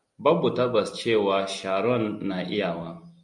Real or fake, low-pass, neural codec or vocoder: real; 10.8 kHz; none